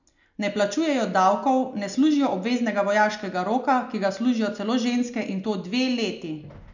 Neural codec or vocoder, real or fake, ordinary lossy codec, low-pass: none; real; none; 7.2 kHz